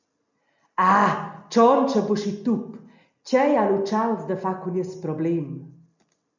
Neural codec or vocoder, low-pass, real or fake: none; 7.2 kHz; real